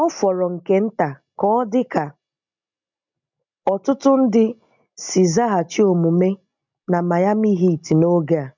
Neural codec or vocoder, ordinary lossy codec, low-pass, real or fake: none; MP3, 64 kbps; 7.2 kHz; real